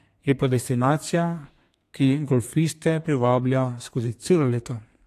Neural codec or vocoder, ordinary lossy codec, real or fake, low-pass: codec, 32 kHz, 1.9 kbps, SNAC; AAC, 64 kbps; fake; 14.4 kHz